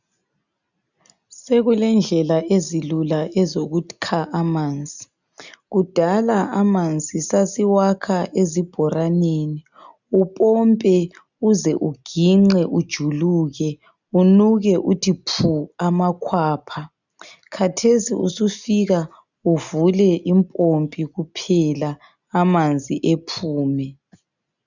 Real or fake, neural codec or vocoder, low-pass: real; none; 7.2 kHz